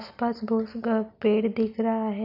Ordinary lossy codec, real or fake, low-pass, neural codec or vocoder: none; real; 5.4 kHz; none